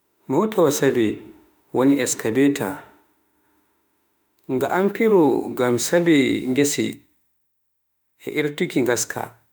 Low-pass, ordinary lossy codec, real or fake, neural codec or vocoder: none; none; fake; autoencoder, 48 kHz, 32 numbers a frame, DAC-VAE, trained on Japanese speech